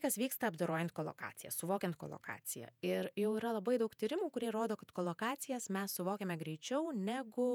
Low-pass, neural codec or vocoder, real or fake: 19.8 kHz; vocoder, 48 kHz, 128 mel bands, Vocos; fake